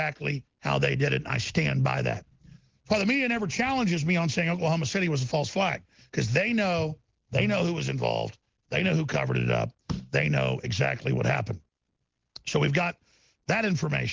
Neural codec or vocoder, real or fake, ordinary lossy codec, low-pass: none; real; Opus, 16 kbps; 7.2 kHz